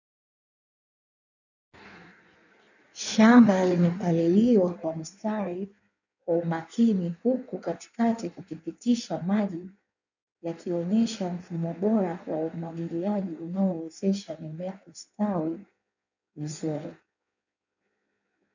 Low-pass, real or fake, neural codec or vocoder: 7.2 kHz; fake; codec, 16 kHz in and 24 kHz out, 1.1 kbps, FireRedTTS-2 codec